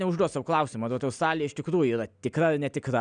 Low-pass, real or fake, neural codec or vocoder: 9.9 kHz; real; none